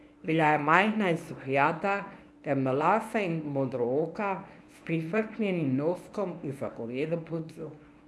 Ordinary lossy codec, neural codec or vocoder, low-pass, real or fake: none; codec, 24 kHz, 0.9 kbps, WavTokenizer, medium speech release version 1; none; fake